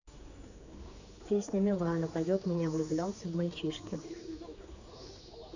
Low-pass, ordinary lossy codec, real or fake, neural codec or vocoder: 7.2 kHz; none; fake; codec, 16 kHz, 4 kbps, X-Codec, HuBERT features, trained on general audio